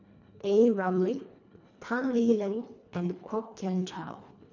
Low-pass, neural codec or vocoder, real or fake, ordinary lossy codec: 7.2 kHz; codec, 24 kHz, 1.5 kbps, HILCodec; fake; none